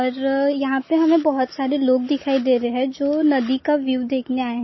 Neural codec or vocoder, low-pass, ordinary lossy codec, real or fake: none; 7.2 kHz; MP3, 24 kbps; real